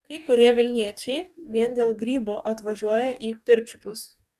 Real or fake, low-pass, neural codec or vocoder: fake; 14.4 kHz; codec, 44.1 kHz, 2.6 kbps, DAC